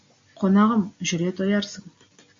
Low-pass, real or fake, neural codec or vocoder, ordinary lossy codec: 7.2 kHz; real; none; MP3, 48 kbps